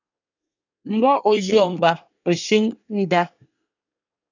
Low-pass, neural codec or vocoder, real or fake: 7.2 kHz; codec, 24 kHz, 1 kbps, SNAC; fake